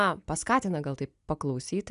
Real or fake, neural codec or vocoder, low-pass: real; none; 10.8 kHz